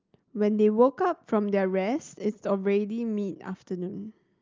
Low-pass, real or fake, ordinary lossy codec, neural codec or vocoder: 7.2 kHz; real; Opus, 24 kbps; none